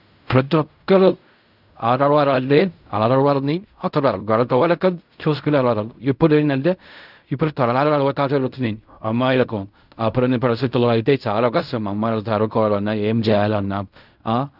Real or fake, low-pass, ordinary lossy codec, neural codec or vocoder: fake; 5.4 kHz; none; codec, 16 kHz in and 24 kHz out, 0.4 kbps, LongCat-Audio-Codec, fine tuned four codebook decoder